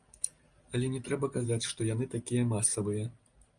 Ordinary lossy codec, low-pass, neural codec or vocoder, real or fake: Opus, 32 kbps; 9.9 kHz; none; real